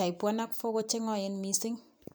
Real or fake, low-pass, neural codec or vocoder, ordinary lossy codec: fake; none; vocoder, 44.1 kHz, 128 mel bands every 256 samples, BigVGAN v2; none